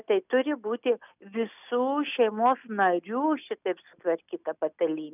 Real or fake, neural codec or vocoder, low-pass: real; none; 3.6 kHz